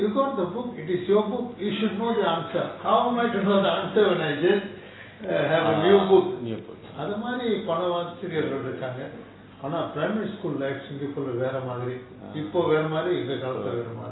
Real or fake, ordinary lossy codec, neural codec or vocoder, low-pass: real; AAC, 16 kbps; none; 7.2 kHz